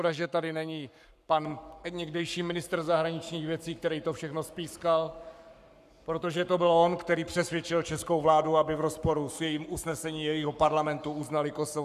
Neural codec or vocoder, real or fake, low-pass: codec, 44.1 kHz, 7.8 kbps, Pupu-Codec; fake; 14.4 kHz